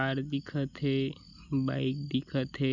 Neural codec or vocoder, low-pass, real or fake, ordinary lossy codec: none; 7.2 kHz; real; none